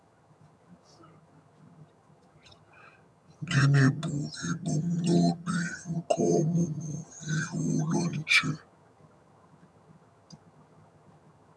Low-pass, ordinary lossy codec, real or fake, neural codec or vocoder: none; none; fake; vocoder, 22.05 kHz, 80 mel bands, HiFi-GAN